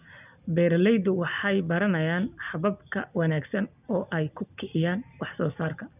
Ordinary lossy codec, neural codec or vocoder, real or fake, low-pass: none; none; real; 3.6 kHz